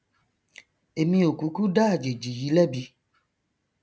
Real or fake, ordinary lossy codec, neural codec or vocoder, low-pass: real; none; none; none